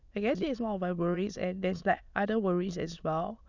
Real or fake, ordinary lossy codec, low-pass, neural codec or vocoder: fake; none; 7.2 kHz; autoencoder, 22.05 kHz, a latent of 192 numbers a frame, VITS, trained on many speakers